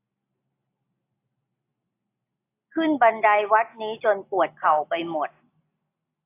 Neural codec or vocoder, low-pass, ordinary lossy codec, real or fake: none; 3.6 kHz; AAC, 24 kbps; real